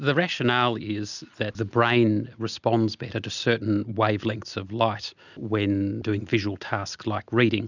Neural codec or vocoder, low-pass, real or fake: none; 7.2 kHz; real